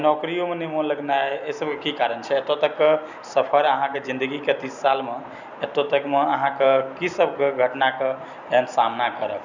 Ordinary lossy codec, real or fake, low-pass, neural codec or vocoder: none; real; 7.2 kHz; none